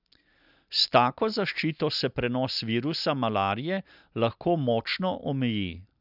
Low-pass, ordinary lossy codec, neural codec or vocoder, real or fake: 5.4 kHz; none; none; real